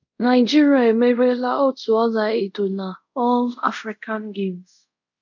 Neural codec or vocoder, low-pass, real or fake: codec, 24 kHz, 0.5 kbps, DualCodec; 7.2 kHz; fake